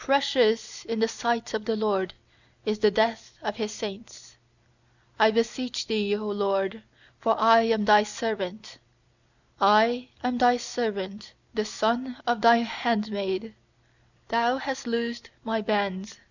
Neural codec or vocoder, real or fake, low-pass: none; real; 7.2 kHz